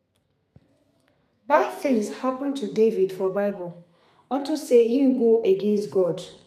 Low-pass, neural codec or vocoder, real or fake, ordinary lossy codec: 14.4 kHz; codec, 32 kHz, 1.9 kbps, SNAC; fake; none